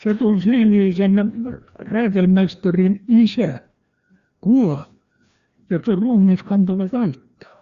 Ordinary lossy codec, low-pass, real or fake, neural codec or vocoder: Opus, 64 kbps; 7.2 kHz; fake; codec, 16 kHz, 1 kbps, FreqCodec, larger model